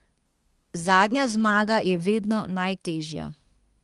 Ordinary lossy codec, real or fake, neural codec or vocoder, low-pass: Opus, 32 kbps; fake; codec, 24 kHz, 1 kbps, SNAC; 10.8 kHz